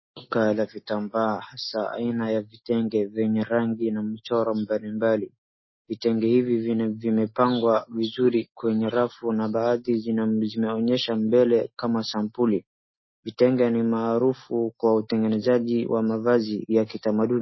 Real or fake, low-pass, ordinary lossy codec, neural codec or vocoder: real; 7.2 kHz; MP3, 24 kbps; none